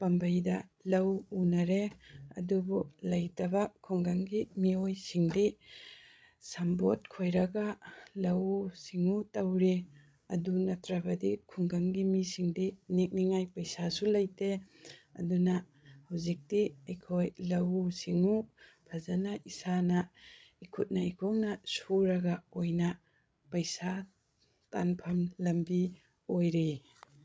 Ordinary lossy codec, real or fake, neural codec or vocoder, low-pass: none; fake; codec, 16 kHz, 16 kbps, FreqCodec, larger model; none